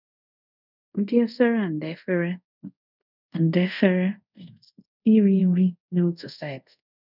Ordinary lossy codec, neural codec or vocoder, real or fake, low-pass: none; codec, 24 kHz, 0.5 kbps, DualCodec; fake; 5.4 kHz